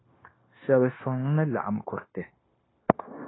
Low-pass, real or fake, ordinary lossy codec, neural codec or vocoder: 7.2 kHz; fake; AAC, 16 kbps; codec, 16 kHz, 0.9 kbps, LongCat-Audio-Codec